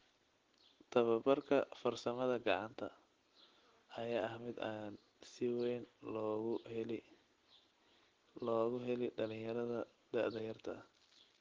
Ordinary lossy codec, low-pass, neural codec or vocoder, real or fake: Opus, 16 kbps; 7.2 kHz; none; real